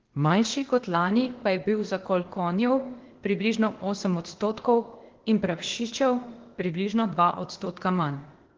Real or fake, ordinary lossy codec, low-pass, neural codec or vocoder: fake; Opus, 16 kbps; 7.2 kHz; codec, 16 kHz, 0.8 kbps, ZipCodec